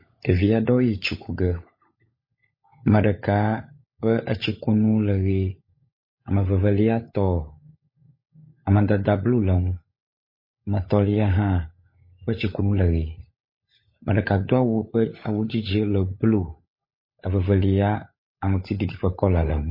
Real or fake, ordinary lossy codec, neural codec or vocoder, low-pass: fake; MP3, 24 kbps; codec, 16 kHz, 16 kbps, FunCodec, trained on LibriTTS, 50 frames a second; 5.4 kHz